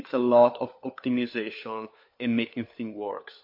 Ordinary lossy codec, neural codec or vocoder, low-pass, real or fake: MP3, 32 kbps; codec, 16 kHz, 4 kbps, FunCodec, trained on LibriTTS, 50 frames a second; 5.4 kHz; fake